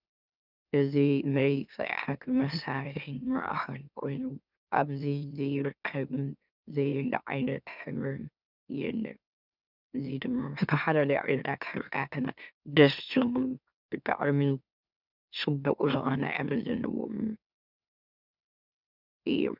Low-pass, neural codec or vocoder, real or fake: 5.4 kHz; autoencoder, 44.1 kHz, a latent of 192 numbers a frame, MeloTTS; fake